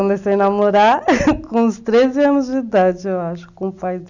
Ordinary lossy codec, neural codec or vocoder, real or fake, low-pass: none; none; real; 7.2 kHz